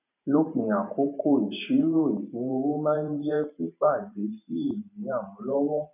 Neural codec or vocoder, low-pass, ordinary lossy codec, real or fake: vocoder, 44.1 kHz, 128 mel bands every 512 samples, BigVGAN v2; 3.6 kHz; none; fake